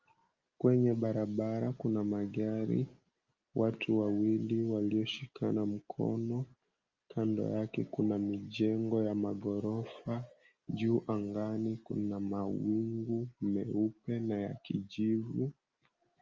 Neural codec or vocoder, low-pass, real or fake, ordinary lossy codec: none; 7.2 kHz; real; Opus, 32 kbps